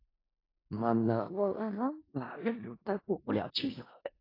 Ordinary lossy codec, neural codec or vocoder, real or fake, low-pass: AAC, 24 kbps; codec, 16 kHz in and 24 kHz out, 0.4 kbps, LongCat-Audio-Codec, four codebook decoder; fake; 5.4 kHz